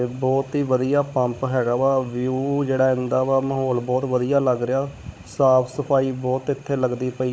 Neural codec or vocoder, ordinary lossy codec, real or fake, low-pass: codec, 16 kHz, 16 kbps, FunCodec, trained on Chinese and English, 50 frames a second; none; fake; none